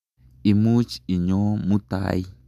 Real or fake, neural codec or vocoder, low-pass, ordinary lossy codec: real; none; 14.4 kHz; none